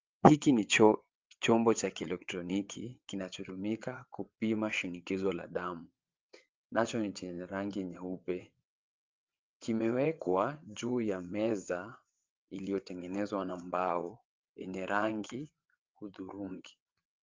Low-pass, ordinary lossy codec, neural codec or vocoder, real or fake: 7.2 kHz; Opus, 24 kbps; vocoder, 22.05 kHz, 80 mel bands, WaveNeXt; fake